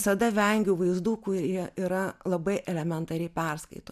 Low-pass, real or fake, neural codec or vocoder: 14.4 kHz; real; none